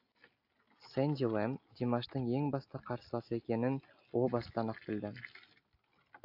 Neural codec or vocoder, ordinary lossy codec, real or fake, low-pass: none; AAC, 48 kbps; real; 5.4 kHz